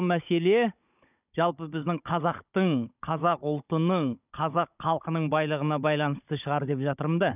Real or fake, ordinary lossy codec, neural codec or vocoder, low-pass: fake; none; codec, 16 kHz, 16 kbps, FunCodec, trained on Chinese and English, 50 frames a second; 3.6 kHz